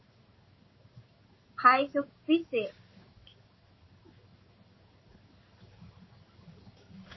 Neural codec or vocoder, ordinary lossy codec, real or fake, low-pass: codec, 16 kHz in and 24 kHz out, 1 kbps, XY-Tokenizer; MP3, 24 kbps; fake; 7.2 kHz